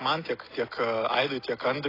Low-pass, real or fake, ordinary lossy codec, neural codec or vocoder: 5.4 kHz; real; AAC, 24 kbps; none